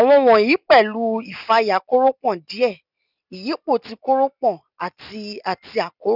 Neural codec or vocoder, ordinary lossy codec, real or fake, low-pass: none; none; real; 5.4 kHz